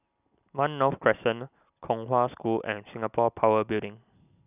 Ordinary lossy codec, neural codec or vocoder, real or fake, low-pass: none; none; real; 3.6 kHz